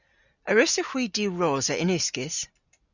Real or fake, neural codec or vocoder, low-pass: real; none; 7.2 kHz